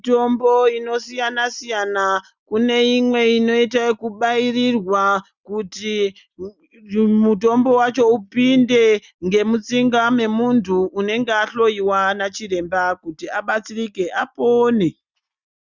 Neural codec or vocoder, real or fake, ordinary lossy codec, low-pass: none; real; Opus, 64 kbps; 7.2 kHz